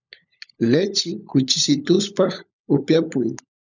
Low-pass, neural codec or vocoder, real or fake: 7.2 kHz; codec, 16 kHz, 16 kbps, FunCodec, trained on LibriTTS, 50 frames a second; fake